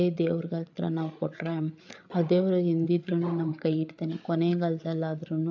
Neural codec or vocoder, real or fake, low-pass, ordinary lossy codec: codec, 16 kHz, 8 kbps, FreqCodec, larger model; fake; 7.2 kHz; AAC, 48 kbps